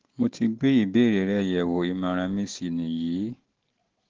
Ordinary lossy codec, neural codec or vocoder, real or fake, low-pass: Opus, 16 kbps; none; real; 7.2 kHz